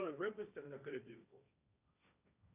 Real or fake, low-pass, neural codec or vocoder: fake; 3.6 kHz; codec, 16 kHz, 1.1 kbps, Voila-Tokenizer